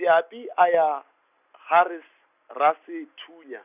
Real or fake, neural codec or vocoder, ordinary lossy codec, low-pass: real; none; none; 3.6 kHz